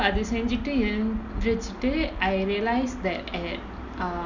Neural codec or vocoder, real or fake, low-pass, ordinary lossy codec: none; real; 7.2 kHz; none